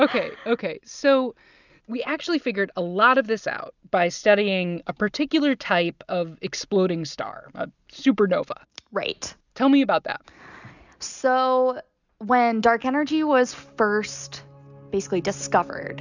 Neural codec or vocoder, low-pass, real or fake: none; 7.2 kHz; real